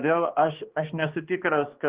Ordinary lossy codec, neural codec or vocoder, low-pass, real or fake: Opus, 16 kbps; codec, 16 kHz, 2 kbps, X-Codec, HuBERT features, trained on balanced general audio; 3.6 kHz; fake